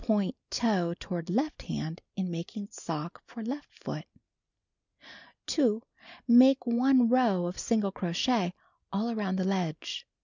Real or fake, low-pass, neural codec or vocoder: real; 7.2 kHz; none